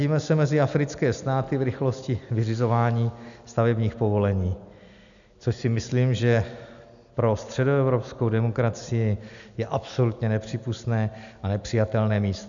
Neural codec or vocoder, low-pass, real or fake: none; 7.2 kHz; real